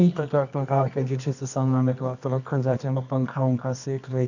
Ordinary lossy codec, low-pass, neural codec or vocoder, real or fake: none; 7.2 kHz; codec, 24 kHz, 0.9 kbps, WavTokenizer, medium music audio release; fake